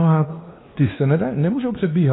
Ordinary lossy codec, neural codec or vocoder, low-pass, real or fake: AAC, 16 kbps; codec, 16 kHz, 2 kbps, X-Codec, WavLM features, trained on Multilingual LibriSpeech; 7.2 kHz; fake